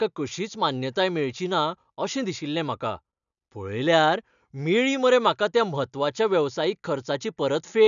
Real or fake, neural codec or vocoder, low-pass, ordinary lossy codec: real; none; 7.2 kHz; none